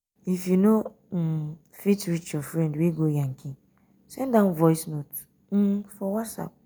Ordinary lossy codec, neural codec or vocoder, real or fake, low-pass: none; none; real; none